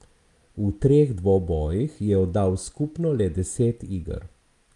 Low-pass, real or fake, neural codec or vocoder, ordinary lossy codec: 10.8 kHz; real; none; Opus, 32 kbps